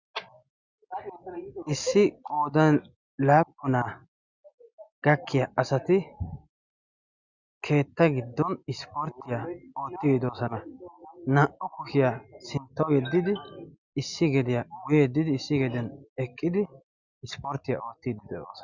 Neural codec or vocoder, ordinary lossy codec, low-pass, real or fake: none; Opus, 64 kbps; 7.2 kHz; real